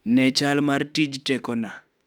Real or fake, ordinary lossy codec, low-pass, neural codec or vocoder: fake; none; 19.8 kHz; autoencoder, 48 kHz, 32 numbers a frame, DAC-VAE, trained on Japanese speech